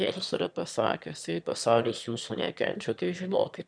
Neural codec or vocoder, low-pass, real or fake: autoencoder, 22.05 kHz, a latent of 192 numbers a frame, VITS, trained on one speaker; 9.9 kHz; fake